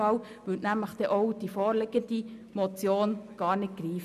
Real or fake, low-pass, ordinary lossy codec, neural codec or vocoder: real; 14.4 kHz; none; none